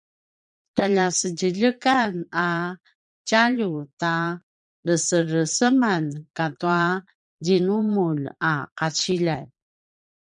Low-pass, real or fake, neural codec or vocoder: 9.9 kHz; fake; vocoder, 22.05 kHz, 80 mel bands, Vocos